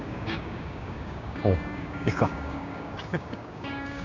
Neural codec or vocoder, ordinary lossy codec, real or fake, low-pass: codec, 16 kHz, 6 kbps, DAC; none; fake; 7.2 kHz